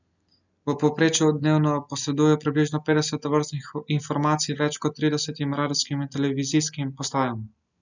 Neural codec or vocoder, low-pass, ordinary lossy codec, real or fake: none; 7.2 kHz; none; real